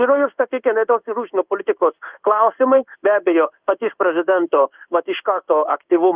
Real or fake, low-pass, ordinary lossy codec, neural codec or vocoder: fake; 3.6 kHz; Opus, 32 kbps; codec, 16 kHz in and 24 kHz out, 1 kbps, XY-Tokenizer